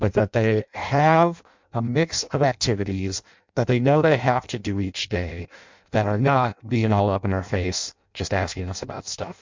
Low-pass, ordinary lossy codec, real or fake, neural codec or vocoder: 7.2 kHz; MP3, 64 kbps; fake; codec, 16 kHz in and 24 kHz out, 0.6 kbps, FireRedTTS-2 codec